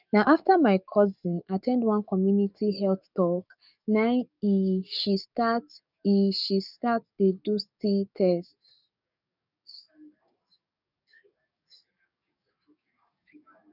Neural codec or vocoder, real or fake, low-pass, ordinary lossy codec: codec, 44.1 kHz, 7.8 kbps, DAC; fake; 5.4 kHz; none